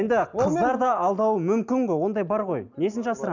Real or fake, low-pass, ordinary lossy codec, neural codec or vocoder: real; 7.2 kHz; none; none